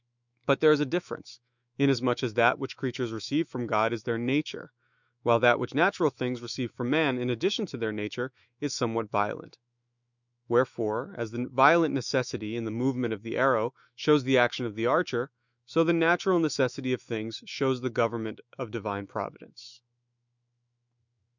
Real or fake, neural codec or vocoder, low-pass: fake; autoencoder, 48 kHz, 128 numbers a frame, DAC-VAE, trained on Japanese speech; 7.2 kHz